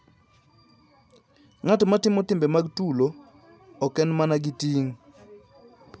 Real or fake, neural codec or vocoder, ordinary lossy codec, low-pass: real; none; none; none